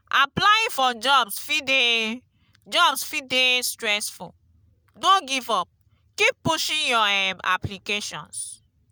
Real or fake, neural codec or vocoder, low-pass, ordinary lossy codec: real; none; none; none